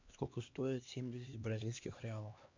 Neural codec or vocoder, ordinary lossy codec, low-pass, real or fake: codec, 16 kHz, 2 kbps, X-Codec, HuBERT features, trained on LibriSpeech; MP3, 48 kbps; 7.2 kHz; fake